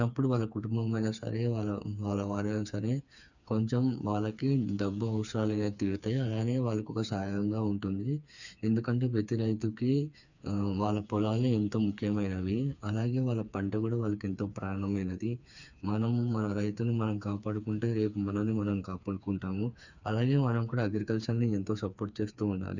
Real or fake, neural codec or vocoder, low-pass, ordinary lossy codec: fake; codec, 16 kHz, 4 kbps, FreqCodec, smaller model; 7.2 kHz; none